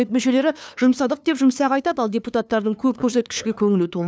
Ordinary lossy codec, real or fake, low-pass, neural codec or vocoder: none; fake; none; codec, 16 kHz, 2 kbps, FunCodec, trained on LibriTTS, 25 frames a second